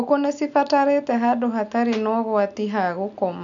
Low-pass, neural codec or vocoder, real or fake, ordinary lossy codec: 7.2 kHz; none; real; none